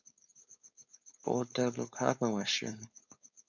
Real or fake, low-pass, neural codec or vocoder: fake; 7.2 kHz; codec, 16 kHz, 4.8 kbps, FACodec